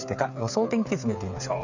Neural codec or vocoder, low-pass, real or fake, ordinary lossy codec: codec, 16 kHz, 8 kbps, FreqCodec, smaller model; 7.2 kHz; fake; none